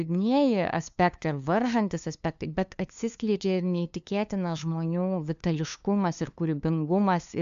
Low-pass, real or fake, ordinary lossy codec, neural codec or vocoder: 7.2 kHz; fake; AAC, 96 kbps; codec, 16 kHz, 2 kbps, FunCodec, trained on LibriTTS, 25 frames a second